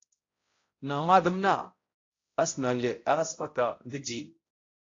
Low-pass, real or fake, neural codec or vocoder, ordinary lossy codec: 7.2 kHz; fake; codec, 16 kHz, 0.5 kbps, X-Codec, HuBERT features, trained on balanced general audio; AAC, 32 kbps